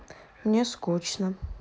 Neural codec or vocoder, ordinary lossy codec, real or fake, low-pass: none; none; real; none